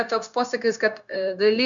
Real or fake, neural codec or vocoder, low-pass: fake; codec, 16 kHz, 0.9 kbps, LongCat-Audio-Codec; 7.2 kHz